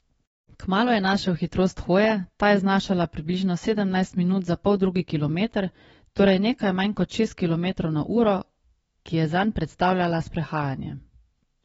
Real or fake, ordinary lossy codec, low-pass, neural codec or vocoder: fake; AAC, 24 kbps; 19.8 kHz; autoencoder, 48 kHz, 128 numbers a frame, DAC-VAE, trained on Japanese speech